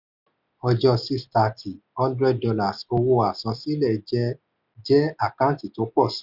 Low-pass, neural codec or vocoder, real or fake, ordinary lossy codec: 5.4 kHz; none; real; none